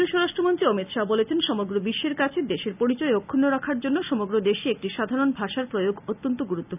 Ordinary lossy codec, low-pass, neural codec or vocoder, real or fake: none; 3.6 kHz; none; real